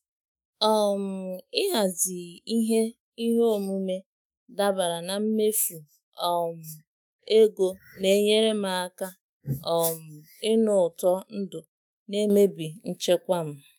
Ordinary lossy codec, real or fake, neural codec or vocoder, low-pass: none; fake; autoencoder, 48 kHz, 128 numbers a frame, DAC-VAE, trained on Japanese speech; none